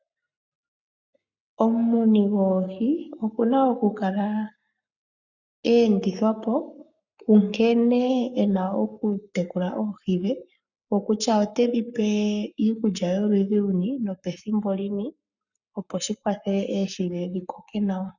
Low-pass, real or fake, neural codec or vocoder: 7.2 kHz; fake; codec, 44.1 kHz, 7.8 kbps, Pupu-Codec